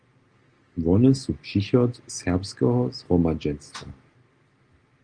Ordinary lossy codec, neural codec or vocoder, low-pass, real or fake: Opus, 24 kbps; none; 9.9 kHz; real